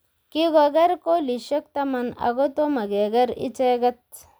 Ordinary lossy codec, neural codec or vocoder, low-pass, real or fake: none; none; none; real